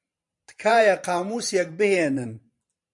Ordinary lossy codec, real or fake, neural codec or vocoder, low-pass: MP3, 64 kbps; fake; vocoder, 44.1 kHz, 128 mel bands every 512 samples, BigVGAN v2; 10.8 kHz